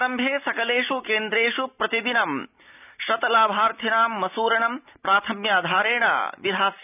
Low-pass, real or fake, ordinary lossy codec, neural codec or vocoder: 3.6 kHz; real; none; none